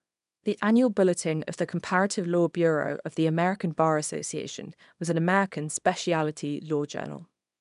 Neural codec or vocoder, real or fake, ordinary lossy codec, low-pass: codec, 24 kHz, 0.9 kbps, WavTokenizer, small release; fake; none; 10.8 kHz